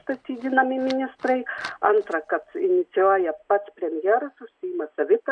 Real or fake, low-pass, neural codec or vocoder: real; 9.9 kHz; none